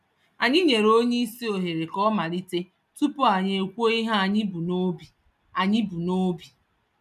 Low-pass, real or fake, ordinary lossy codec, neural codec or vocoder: 14.4 kHz; real; none; none